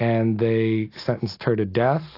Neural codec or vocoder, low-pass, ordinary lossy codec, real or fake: none; 5.4 kHz; AAC, 32 kbps; real